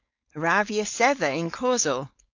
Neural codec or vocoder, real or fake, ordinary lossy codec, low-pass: codec, 16 kHz, 4.8 kbps, FACodec; fake; AAC, 48 kbps; 7.2 kHz